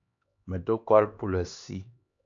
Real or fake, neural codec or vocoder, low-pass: fake; codec, 16 kHz, 2 kbps, X-Codec, HuBERT features, trained on LibriSpeech; 7.2 kHz